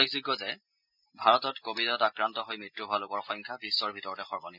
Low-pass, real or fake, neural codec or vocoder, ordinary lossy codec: 5.4 kHz; real; none; none